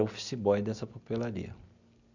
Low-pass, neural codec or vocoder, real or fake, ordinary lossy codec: 7.2 kHz; none; real; none